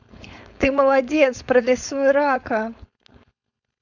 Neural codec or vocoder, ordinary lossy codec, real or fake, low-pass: codec, 16 kHz, 4.8 kbps, FACodec; none; fake; 7.2 kHz